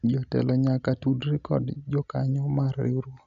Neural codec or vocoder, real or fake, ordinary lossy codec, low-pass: none; real; none; 7.2 kHz